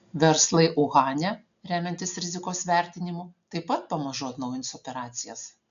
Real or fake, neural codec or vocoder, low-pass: real; none; 7.2 kHz